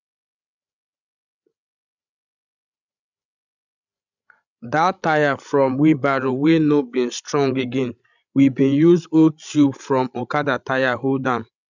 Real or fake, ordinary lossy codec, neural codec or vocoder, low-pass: fake; none; codec, 16 kHz, 16 kbps, FreqCodec, larger model; 7.2 kHz